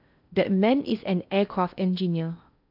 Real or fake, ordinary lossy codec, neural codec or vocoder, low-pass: fake; none; codec, 16 kHz in and 24 kHz out, 0.6 kbps, FocalCodec, streaming, 4096 codes; 5.4 kHz